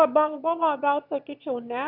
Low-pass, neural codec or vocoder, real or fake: 5.4 kHz; autoencoder, 22.05 kHz, a latent of 192 numbers a frame, VITS, trained on one speaker; fake